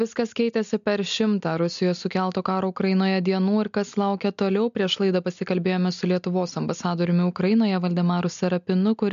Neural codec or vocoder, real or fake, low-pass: none; real; 7.2 kHz